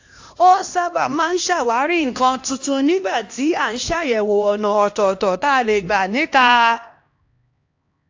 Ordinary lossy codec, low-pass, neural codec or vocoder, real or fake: AAC, 48 kbps; 7.2 kHz; codec, 16 kHz, 1 kbps, X-Codec, HuBERT features, trained on LibriSpeech; fake